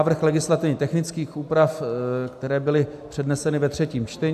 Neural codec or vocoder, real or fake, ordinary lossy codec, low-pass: none; real; AAC, 96 kbps; 14.4 kHz